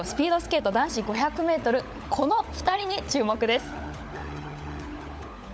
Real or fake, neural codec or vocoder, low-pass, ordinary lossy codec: fake; codec, 16 kHz, 16 kbps, FunCodec, trained on LibriTTS, 50 frames a second; none; none